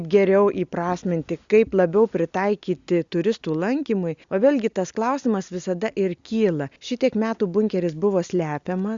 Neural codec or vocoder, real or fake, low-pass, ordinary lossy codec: none; real; 7.2 kHz; Opus, 64 kbps